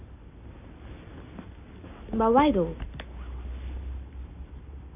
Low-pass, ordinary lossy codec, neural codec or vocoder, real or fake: 3.6 kHz; none; none; real